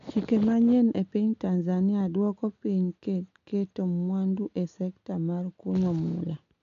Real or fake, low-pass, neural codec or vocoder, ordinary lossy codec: real; 7.2 kHz; none; MP3, 64 kbps